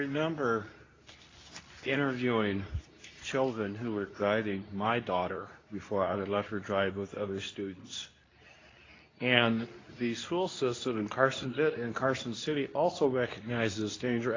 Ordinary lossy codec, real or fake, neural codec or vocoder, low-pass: AAC, 32 kbps; fake; codec, 24 kHz, 0.9 kbps, WavTokenizer, medium speech release version 2; 7.2 kHz